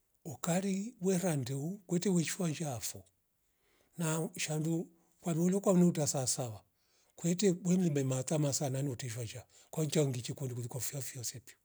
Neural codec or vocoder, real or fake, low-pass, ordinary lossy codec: none; real; none; none